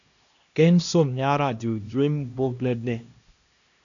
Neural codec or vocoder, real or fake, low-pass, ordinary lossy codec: codec, 16 kHz, 1 kbps, X-Codec, HuBERT features, trained on LibriSpeech; fake; 7.2 kHz; MP3, 96 kbps